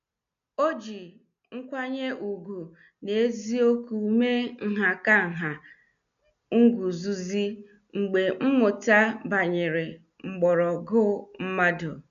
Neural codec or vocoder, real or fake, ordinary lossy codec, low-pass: none; real; none; 7.2 kHz